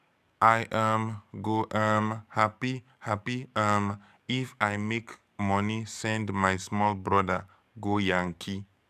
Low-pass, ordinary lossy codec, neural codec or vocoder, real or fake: 14.4 kHz; none; codec, 44.1 kHz, 7.8 kbps, DAC; fake